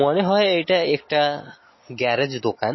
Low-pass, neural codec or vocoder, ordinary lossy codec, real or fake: 7.2 kHz; none; MP3, 24 kbps; real